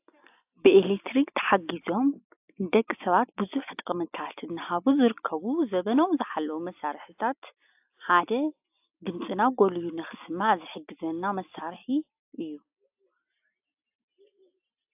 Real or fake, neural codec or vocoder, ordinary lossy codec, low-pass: real; none; AAC, 32 kbps; 3.6 kHz